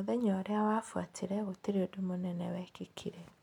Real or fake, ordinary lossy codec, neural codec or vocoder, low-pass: real; none; none; 19.8 kHz